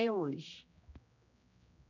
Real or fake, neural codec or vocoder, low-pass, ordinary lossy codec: fake; codec, 16 kHz, 1 kbps, X-Codec, HuBERT features, trained on general audio; 7.2 kHz; none